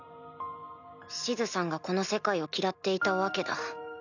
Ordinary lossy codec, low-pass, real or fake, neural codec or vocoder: none; 7.2 kHz; real; none